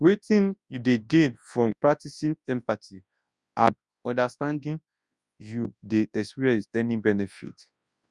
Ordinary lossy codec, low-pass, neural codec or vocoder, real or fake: Opus, 64 kbps; 10.8 kHz; codec, 24 kHz, 0.9 kbps, WavTokenizer, large speech release; fake